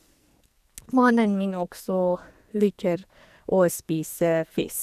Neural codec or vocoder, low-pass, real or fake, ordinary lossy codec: codec, 44.1 kHz, 2.6 kbps, SNAC; 14.4 kHz; fake; none